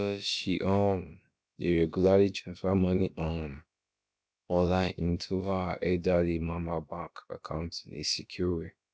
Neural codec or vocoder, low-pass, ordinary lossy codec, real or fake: codec, 16 kHz, about 1 kbps, DyCAST, with the encoder's durations; none; none; fake